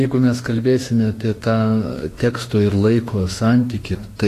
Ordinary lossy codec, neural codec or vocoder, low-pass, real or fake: AAC, 48 kbps; autoencoder, 48 kHz, 32 numbers a frame, DAC-VAE, trained on Japanese speech; 14.4 kHz; fake